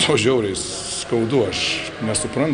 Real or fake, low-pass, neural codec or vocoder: real; 9.9 kHz; none